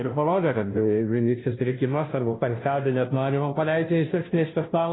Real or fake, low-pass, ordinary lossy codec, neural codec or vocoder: fake; 7.2 kHz; AAC, 16 kbps; codec, 16 kHz, 0.5 kbps, FunCodec, trained on Chinese and English, 25 frames a second